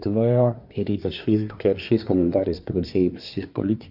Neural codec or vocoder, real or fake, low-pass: codec, 24 kHz, 1 kbps, SNAC; fake; 5.4 kHz